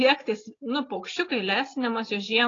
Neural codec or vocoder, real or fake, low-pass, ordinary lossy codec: none; real; 7.2 kHz; AAC, 32 kbps